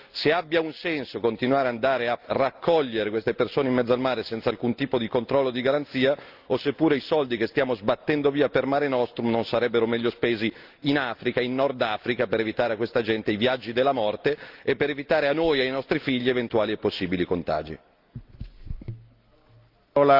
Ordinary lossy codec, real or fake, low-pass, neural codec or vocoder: Opus, 24 kbps; real; 5.4 kHz; none